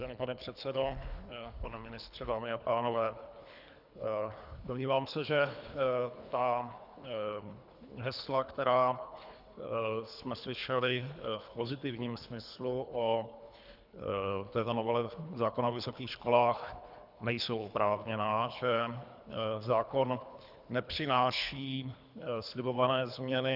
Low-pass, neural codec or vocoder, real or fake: 5.4 kHz; codec, 24 kHz, 3 kbps, HILCodec; fake